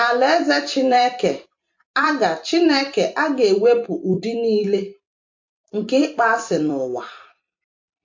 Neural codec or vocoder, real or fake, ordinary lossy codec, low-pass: vocoder, 44.1 kHz, 128 mel bands every 256 samples, BigVGAN v2; fake; MP3, 48 kbps; 7.2 kHz